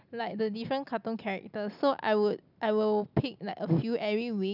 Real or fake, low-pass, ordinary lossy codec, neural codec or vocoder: real; 5.4 kHz; none; none